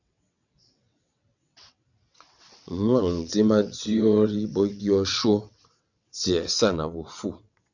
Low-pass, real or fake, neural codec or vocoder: 7.2 kHz; fake; vocoder, 22.05 kHz, 80 mel bands, WaveNeXt